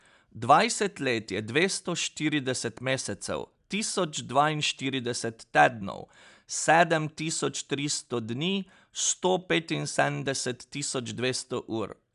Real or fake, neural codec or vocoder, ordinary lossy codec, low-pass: real; none; none; 10.8 kHz